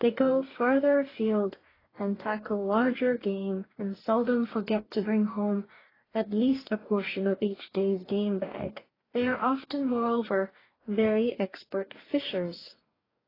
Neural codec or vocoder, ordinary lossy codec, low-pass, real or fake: codec, 44.1 kHz, 2.6 kbps, DAC; AAC, 24 kbps; 5.4 kHz; fake